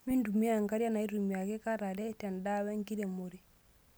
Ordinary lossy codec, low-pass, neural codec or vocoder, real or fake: none; none; none; real